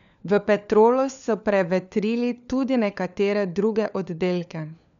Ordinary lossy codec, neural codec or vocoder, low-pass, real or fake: none; codec, 16 kHz, 4 kbps, FunCodec, trained on LibriTTS, 50 frames a second; 7.2 kHz; fake